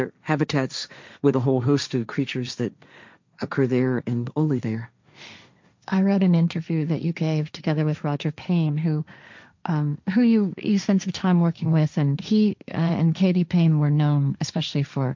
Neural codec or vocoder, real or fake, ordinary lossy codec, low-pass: codec, 16 kHz, 1.1 kbps, Voila-Tokenizer; fake; MP3, 64 kbps; 7.2 kHz